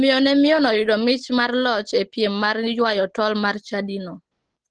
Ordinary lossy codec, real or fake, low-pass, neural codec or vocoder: Opus, 16 kbps; real; 9.9 kHz; none